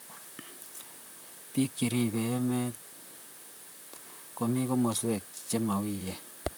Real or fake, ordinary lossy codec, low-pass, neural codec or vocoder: fake; none; none; vocoder, 44.1 kHz, 128 mel bands, Pupu-Vocoder